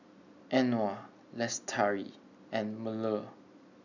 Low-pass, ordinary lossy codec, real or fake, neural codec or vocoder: 7.2 kHz; none; real; none